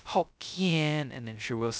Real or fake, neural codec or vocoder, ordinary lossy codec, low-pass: fake; codec, 16 kHz, 0.2 kbps, FocalCodec; none; none